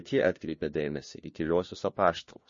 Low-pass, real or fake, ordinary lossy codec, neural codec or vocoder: 7.2 kHz; fake; MP3, 32 kbps; codec, 16 kHz, 1 kbps, FunCodec, trained on LibriTTS, 50 frames a second